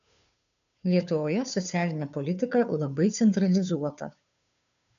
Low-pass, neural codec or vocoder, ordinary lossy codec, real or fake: 7.2 kHz; codec, 16 kHz, 2 kbps, FunCodec, trained on Chinese and English, 25 frames a second; MP3, 96 kbps; fake